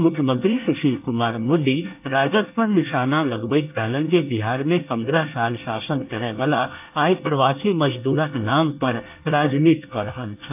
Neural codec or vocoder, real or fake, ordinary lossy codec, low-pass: codec, 24 kHz, 1 kbps, SNAC; fake; AAC, 32 kbps; 3.6 kHz